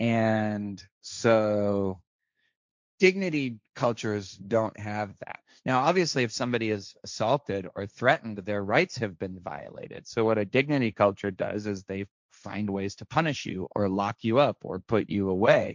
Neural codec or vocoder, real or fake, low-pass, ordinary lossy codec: codec, 16 kHz, 1.1 kbps, Voila-Tokenizer; fake; 7.2 kHz; MP3, 64 kbps